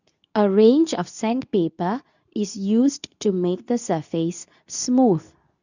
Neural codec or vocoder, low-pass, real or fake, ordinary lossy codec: codec, 24 kHz, 0.9 kbps, WavTokenizer, medium speech release version 2; 7.2 kHz; fake; none